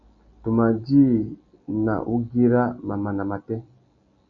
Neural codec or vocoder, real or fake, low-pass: none; real; 7.2 kHz